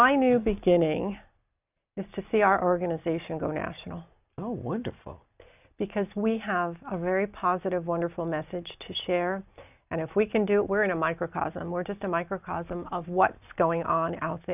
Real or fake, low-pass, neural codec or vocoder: real; 3.6 kHz; none